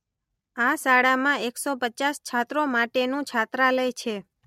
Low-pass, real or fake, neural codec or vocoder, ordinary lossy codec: 19.8 kHz; real; none; MP3, 64 kbps